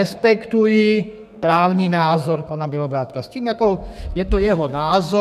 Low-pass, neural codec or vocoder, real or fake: 14.4 kHz; codec, 32 kHz, 1.9 kbps, SNAC; fake